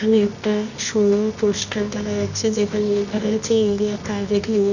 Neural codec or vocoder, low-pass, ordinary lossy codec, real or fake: codec, 24 kHz, 0.9 kbps, WavTokenizer, medium music audio release; 7.2 kHz; none; fake